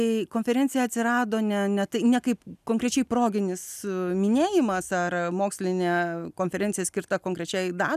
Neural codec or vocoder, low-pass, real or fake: none; 14.4 kHz; real